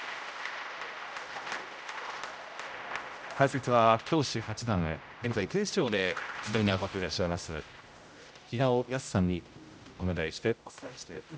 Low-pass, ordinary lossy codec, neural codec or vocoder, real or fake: none; none; codec, 16 kHz, 0.5 kbps, X-Codec, HuBERT features, trained on general audio; fake